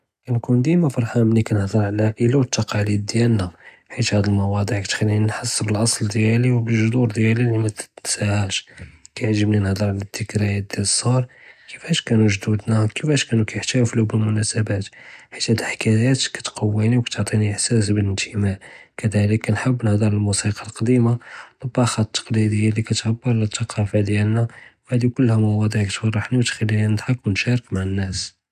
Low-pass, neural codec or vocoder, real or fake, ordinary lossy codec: 14.4 kHz; none; real; none